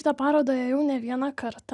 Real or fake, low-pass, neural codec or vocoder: real; 10.8 kHz; none